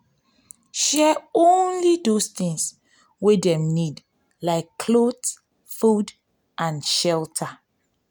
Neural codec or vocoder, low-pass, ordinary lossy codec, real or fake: none; none; none; real